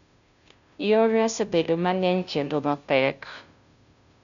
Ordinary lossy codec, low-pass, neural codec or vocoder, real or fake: none; 7.2 kHz; codec, 16 kHz, 0.5 kbps, FunCodec, trained on Chinese and English, 25 frames a second; fake